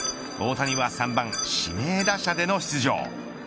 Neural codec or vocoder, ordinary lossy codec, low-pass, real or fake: none; none; none; real